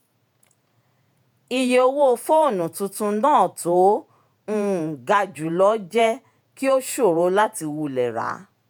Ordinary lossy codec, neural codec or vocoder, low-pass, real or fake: none; vocoder, 48 kHz, 128 mel bands, Vocos; none; fake